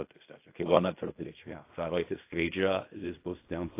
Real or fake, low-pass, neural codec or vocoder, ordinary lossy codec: fake; 3.6 kHz; codec, 16 kHz in and 24 kHz out, 0.4 kbps, LongCat-Audio-Codec, fine tuned four codebook decoder; AAC, 24 kbps